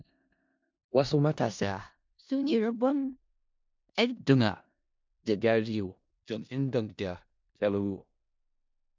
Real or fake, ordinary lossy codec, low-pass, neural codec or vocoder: fake; MP3, 64 kbps; 7.2 kHz; codec, 16 kHz in and 24 kHz out, 0.4 kbps, LongCat-Audio-Codec, four codebook decoder